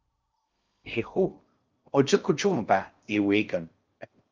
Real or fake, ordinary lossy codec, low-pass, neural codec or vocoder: fake; Opus, 32 kbps; 7.2 kHz; codec, 16 kHz in and 24 kHz out, 0.6 kbps, FocalCodec, streaming, 2048 codes